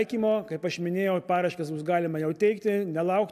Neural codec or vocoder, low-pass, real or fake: none; 14.4 kHz; real